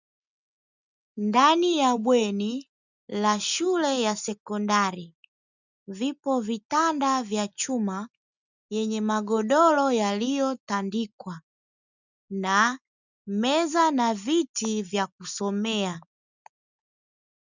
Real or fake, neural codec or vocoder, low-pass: real; none; 7.2 kHz